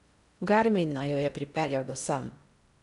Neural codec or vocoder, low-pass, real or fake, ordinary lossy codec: codec, 16 kHz in and 24 kHz out, 0.6 kbps, FocalCodec, streaming, 4096 codes; 10.8 kHz; fake; none